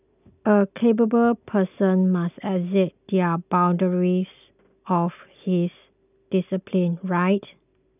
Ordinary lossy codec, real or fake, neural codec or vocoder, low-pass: none; real; none; 3.6 kHz